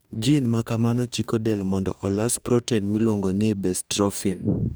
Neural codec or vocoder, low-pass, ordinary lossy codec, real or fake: codec, 44.1 kHz, 2.6 kbps, DAC; none; none; fake